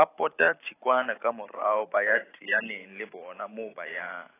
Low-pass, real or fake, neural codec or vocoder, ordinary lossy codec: 3.6 kHz; fake; vocoder, 44.1 kHz, 128 mel bands every 512 samples, BigVGAN v2; AAC, 16 kbps